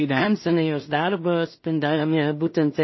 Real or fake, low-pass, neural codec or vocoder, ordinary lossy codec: fake; 7.2 kHz; codec, 16 kHz in and 24 kHz out, 0.4 kbps, LongCat-Audio-Codec, two codebook decoder; MP3, 24 kbps